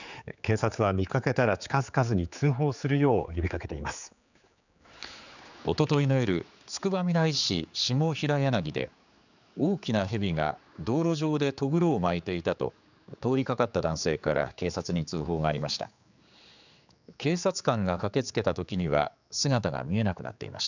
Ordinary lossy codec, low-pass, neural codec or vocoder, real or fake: none; 7.2 kHz; codec, 16 kHz, 4 kbps, X-Codec, HuBERT features, trained on general audio; fake